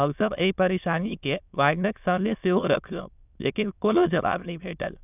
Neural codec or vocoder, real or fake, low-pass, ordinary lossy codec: autoencoder, 22.05 kHz, a latent of 192 numbers a frame, VITS, trained on many speakers; fake; 3.6 kHz; none